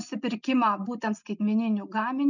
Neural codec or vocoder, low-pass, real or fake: none; 7.2 kHz; real